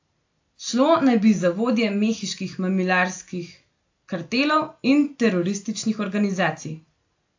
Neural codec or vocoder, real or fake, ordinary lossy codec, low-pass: none; real; AAC, 48 kbps; 7.2 kHz